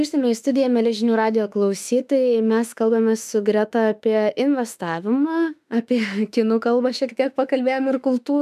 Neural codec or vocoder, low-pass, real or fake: autoencoder, 48 kHz, 32 numbers a frame, DAC-VAE, trained on Japanese speech; 14.4 kHz; fake